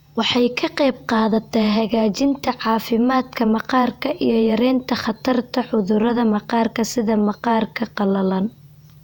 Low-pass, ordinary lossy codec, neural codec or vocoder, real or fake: 19.8 kHz; none; vocoder, 48 kHz, 128 mel bands, Vocos; fake